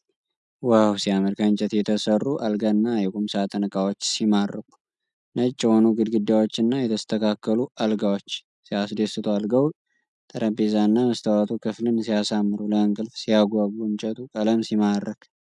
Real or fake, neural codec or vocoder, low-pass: real; none; 10.8 kHz